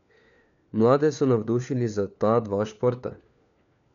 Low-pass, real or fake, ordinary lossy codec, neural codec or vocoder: 7.2 kHz; fake; none; codec, 16 kHz, 4 kbps, FunCodec, trained on LibriTTS, 50 frames a second